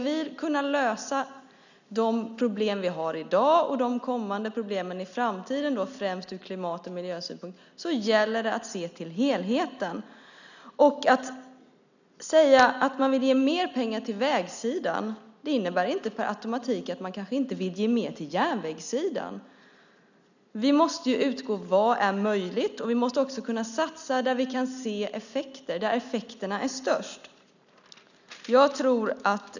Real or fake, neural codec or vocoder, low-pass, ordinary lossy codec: real; none; 7.2 kHz; none